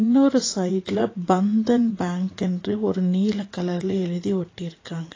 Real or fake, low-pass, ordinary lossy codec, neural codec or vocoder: fake; 7.2 kHz; AAC, 32 kbps; vocoder, 44.1 kHz, 80 mel bands, Vocos